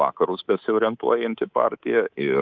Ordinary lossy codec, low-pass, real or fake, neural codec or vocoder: Opus, 32 kbps; 7.2 kHz; fake; codec, 24 kHz, 3.1 kbps, DualCodec